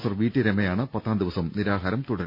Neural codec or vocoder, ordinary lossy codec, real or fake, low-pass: none; none; real; 5.4 kHz